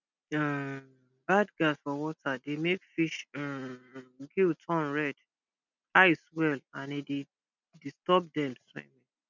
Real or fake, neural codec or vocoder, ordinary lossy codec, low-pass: real; none; none; 7.2 kHz